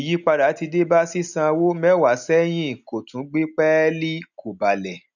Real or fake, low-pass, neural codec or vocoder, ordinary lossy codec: real; 7.2 kHz; none; none